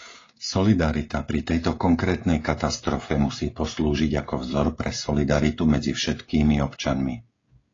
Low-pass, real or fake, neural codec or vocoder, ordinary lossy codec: 7.2 kHz; fake; codec, 16 kHz, 16 kbps, FreqCodec, smaller model; AAC, 32 kbps